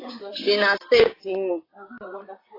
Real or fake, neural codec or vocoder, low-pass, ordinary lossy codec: fake; vocoder, 44.1 kHz, 128 mel bands, Pupu-Vocoder; 5.4 kHz; AAC, 24 kbps